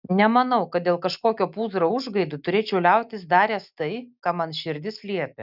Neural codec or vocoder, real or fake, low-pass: none; real; 5.4 kHz